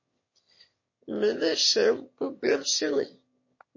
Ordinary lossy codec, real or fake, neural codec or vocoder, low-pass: MP3, 32 kbps; fake; autoencoder, 22.05 kHz, a latent of 192 numbers a frame, VITS, trained on one speaker; 7.2 kHz